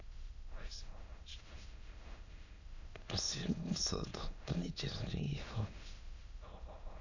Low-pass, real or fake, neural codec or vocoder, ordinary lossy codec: 7.2 kHz; fake; autoencoder, 22.05 kHz, a latent of 192 numbers a frame, VITS, trained on many speakers; none